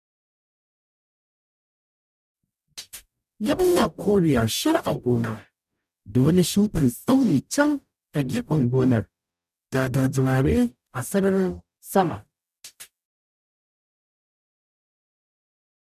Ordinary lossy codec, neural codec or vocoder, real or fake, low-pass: none; codec, 44.1 kHz, 0.9 kbps, DAC; fake; 14.4 kHz